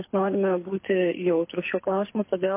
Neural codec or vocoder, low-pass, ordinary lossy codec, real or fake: codec, 24 kHz, 6 kbps, HILCodec; 3.6 kHz; MP3, 24 kbps; fake